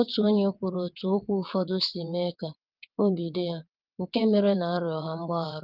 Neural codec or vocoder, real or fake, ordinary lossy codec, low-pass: vocoder, 22.05 kHz, 80 mel bands, WaveNeXt; fake; Opus, 32 kbps; 5.4 kHz